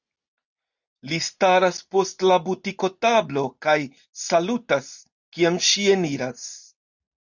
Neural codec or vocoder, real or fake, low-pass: none; real; 7.2 kHz